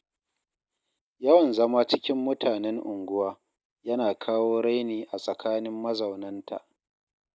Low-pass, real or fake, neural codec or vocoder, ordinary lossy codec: none; real; none; none